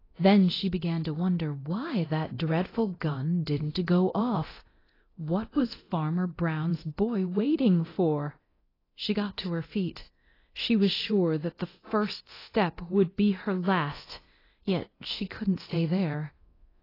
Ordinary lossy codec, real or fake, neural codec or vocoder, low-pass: AAC, 24 kbps; fake; codec, 24 kHz, 0.9 kbps, DualCodec; 5.4 kHz